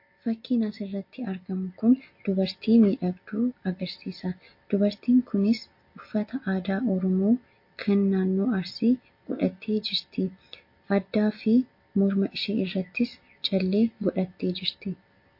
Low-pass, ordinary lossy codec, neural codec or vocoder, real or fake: 5.4 kHz; MP3, 32 kbps; none; real